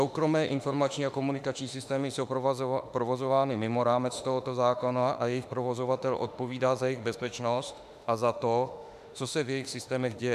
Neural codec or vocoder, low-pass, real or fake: autoencoder, 48 kHz, 32 numbers a frame, DAC-VAE, trained on Japanese speech; 14.4 kHz; fake